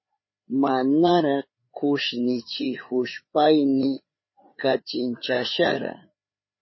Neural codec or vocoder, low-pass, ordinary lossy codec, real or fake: codec, 16 kHz, 4 kbps, FreqCodec, larger model; 7.2 kHz; MP3, 24 kbps; fake